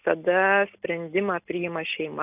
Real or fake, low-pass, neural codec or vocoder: real; 3.6 kHz; none